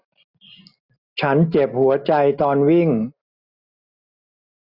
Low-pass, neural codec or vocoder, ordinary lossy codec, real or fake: 5.4 kHz; none; Opus, 64 kbps; real